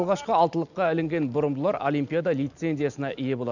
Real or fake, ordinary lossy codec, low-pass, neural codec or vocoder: real; none; 7.2 kHz; none